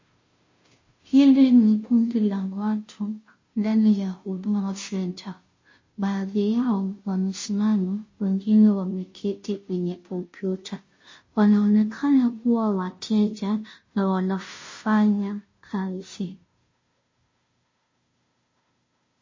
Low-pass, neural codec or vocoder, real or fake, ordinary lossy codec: 7.2 kHz; codec, 16 kHz, 0.5 kbps, FunCodec, trained on Chinese and English, 25 frames a second; fake; MP3, 32 kbps